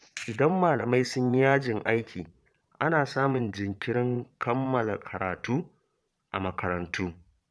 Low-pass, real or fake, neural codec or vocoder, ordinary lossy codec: none; fake; vocoder, 22.05 kHz, 80 mel bands, Vocos; none